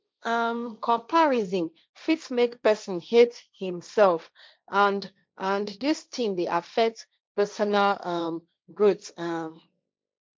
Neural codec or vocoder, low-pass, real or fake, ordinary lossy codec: codec, 16 kHz, 1.1 kbps, Voila-Tokenizer; none; fake; none